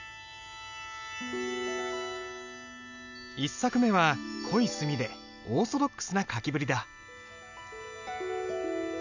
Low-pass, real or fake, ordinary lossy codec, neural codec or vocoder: 7.2 kHz; real; none; none